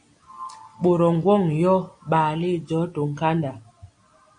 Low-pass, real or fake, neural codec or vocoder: 9.9 kHz; real; none